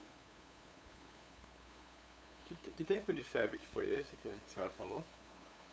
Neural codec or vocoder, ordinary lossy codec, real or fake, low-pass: codec, 16 kHz, 8 kbps, FunCodec, trained on LibriTTS, 25 frames a second; none; fake; none